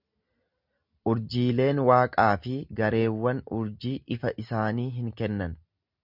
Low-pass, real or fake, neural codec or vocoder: 5.4 kHz; real; none